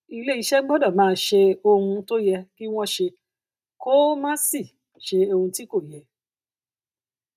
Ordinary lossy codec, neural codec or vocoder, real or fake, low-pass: none; none; real; 14.4 kHz